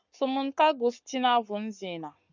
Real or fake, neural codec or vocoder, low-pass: fake; codec, 44.1 kHz, 7.8 kbps, Pupu-Codec; 7.2 kHz